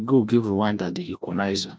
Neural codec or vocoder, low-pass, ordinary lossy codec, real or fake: codec, 16 kHz, 1 kbps, FreqCodec, larger model; none; none; fake